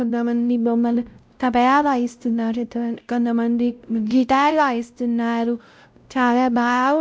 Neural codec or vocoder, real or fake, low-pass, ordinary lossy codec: codec, 16 kHz, 0.5 kbps, X-Codec, WavLM features, trained on Multilingual LibriSpeech; fake; none; none